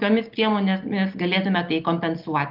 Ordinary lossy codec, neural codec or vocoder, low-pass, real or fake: Opus, 24 kbps; none; 5.4 kHz; real